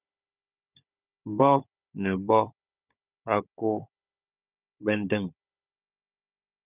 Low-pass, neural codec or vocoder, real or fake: 3.6 kHz; codec, 16 kHz, 16 kbps, FunCodec, trained on Chinese and English, 50 frames a second; fake